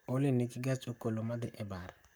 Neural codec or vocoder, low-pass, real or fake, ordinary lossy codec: codec, 44.1 kHz, 7.8 kbps, Pupu-Codec; none; fake; none